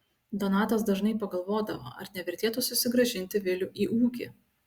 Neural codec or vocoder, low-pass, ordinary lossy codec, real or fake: none; 19.8 kHz; Opus, 64 kbps; real